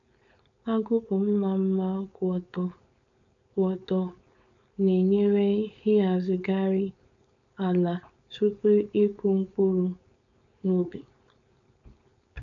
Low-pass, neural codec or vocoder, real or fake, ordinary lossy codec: 7.2 kHz; codec, 16 kHz, 4.8 kbps, FACodec; fake; none